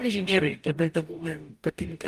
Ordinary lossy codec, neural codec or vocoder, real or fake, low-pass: Opus, 32 kbps; codec, 44.1 kHz, 0.9 kbps, DAC; fake; 14.4 kHz